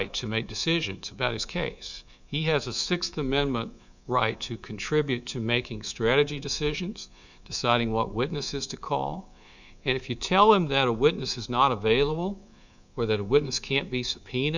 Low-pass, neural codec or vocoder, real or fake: 7.2 kHz; codec, 24 kHz, 3.1 kbps, DualCodec; fake